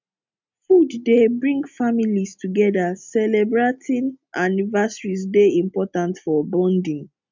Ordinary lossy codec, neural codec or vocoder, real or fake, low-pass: MP3, 64 kbps; none; real; 7.2 kHz